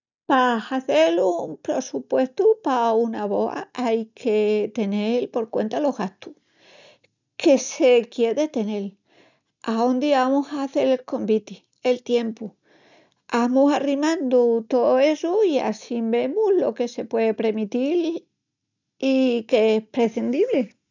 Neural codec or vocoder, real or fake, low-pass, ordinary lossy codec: none; real; 7.2 kHz; none